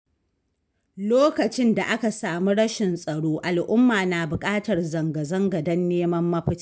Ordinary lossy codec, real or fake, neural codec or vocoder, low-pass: none; real; none; none